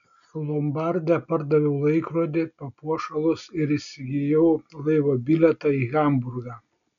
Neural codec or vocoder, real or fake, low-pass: none; real; 7.2 kHz